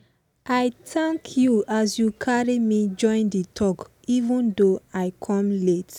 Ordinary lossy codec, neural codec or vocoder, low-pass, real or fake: none; none; 19.8 kHz; real